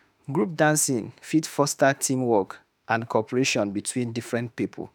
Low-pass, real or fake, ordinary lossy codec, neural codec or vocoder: none; fake; none; autoencoder, 48 kHz, 32 numbers a frame, DAC-VAE, trained on Japanese speech